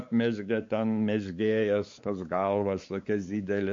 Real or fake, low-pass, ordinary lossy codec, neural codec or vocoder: fake; 7.2 kHz; MP3, 48 kbps; codec, 16 kHz, 4 kbps, X-Codec, WavLM features, trained on Multilingual LibriSpeech